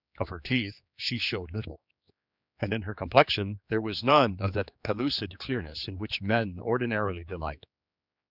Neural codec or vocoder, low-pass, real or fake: codec, 16 kHz, 4 kbps, X-Codec, HuBERT features, trained on general audio; 5.4 kHz; fake